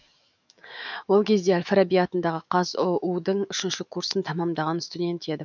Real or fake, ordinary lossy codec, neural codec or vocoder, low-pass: fake; none; autoencoder, 48 kHz, 128 numbers a frame, DAC-VAE, trained on Japanese speech; 7.2 kHz